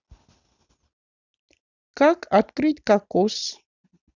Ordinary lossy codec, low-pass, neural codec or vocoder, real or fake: none; 7.2 kHz; none; real